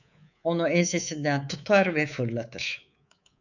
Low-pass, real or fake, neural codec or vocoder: 7.2 kHz; fake; codec, 24 kHz, 3.1 kbps, DualCodec